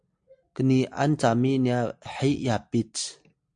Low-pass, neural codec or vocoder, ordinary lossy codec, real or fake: 9.9 kHz; none; AAC, 64 kbps; real